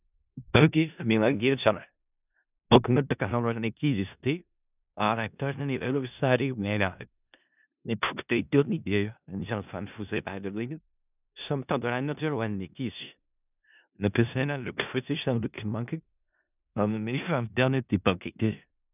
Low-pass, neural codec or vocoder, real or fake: 3.6 kHz; codec, 16 kHz in and 24 kHz out, 0.4 kbps, LongCat-Audio-Codec, four codebook decoder; fake